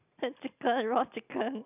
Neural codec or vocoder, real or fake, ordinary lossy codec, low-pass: none; real; none; 3.6 kHz